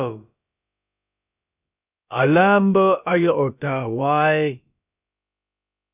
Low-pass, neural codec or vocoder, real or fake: 3.6 kHz; codec, 16 kHz, about 1 kbps, DyCAST, with the encoder's durations; fake